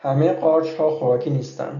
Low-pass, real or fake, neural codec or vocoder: 7.2 kHz; real; none